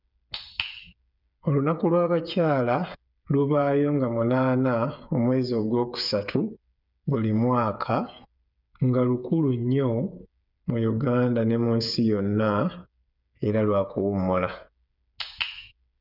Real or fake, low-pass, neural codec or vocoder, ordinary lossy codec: fake; 5.4 kHz; codec, 16 kHz, 8 kbps, FreqCodec, smaller model; none